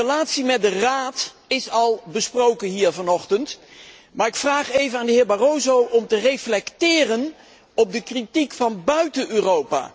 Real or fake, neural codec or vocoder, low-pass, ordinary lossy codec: real; none; none; none